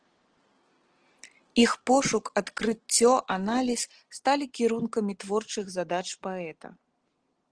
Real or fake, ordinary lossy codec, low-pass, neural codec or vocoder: real; Opus, 16 kbps; 9.9 kHz; none